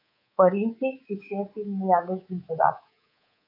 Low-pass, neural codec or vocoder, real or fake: 5.4 kHz; codec, 24 kHz, 3.1 kbps, DualCodec; fake